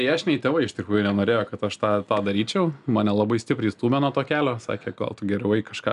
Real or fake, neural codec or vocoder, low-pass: real; none; 10.8 kHz